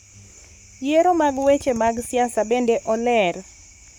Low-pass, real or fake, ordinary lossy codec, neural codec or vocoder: none; fake; none; codec, 44.1 kHz, 7.8 kbps, Pupu-Codec